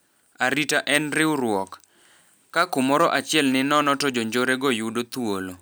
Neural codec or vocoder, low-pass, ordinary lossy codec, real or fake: none; none; none; real